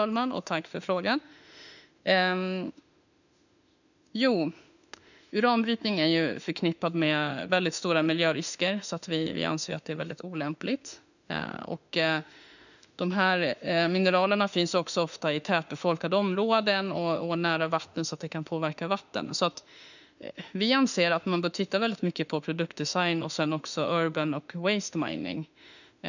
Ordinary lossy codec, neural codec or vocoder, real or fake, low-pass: none; autoencoder, 48 kHz, 32 numbers a frame, DAC-VAE, trained on Japanese speech; fake; 7.2 kHz